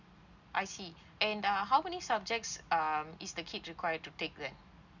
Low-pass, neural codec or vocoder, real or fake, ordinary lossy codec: 7.2 kHz; none; real; none